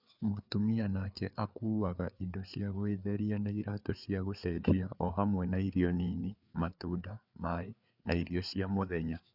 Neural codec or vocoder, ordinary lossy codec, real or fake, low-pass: codec, 16 kHz, 4 kbps, FreqCodec, larger model; none; fake; 5.4 kHz